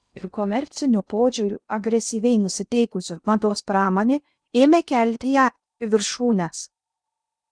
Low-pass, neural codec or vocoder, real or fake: 9.9 kHz; codec, 16 kHz in and 24 kHz out, 0.6 kbps, FocalCodec, streaming, 2048 codes; fake